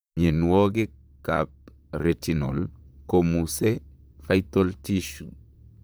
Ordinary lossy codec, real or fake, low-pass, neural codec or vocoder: none; fake; none; vocoder, 44.1 kHz, 128 mel bands, Pupu-Vocoder